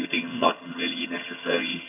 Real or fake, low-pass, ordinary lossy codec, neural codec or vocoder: fake; 3.6 kHz; none; vocoder, 22.05 kHz, 80 mel bands, HiFi-GAN